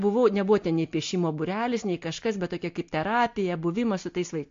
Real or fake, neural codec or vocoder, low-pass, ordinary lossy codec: real; none; 7.2 kHz; AAC, 48 kbps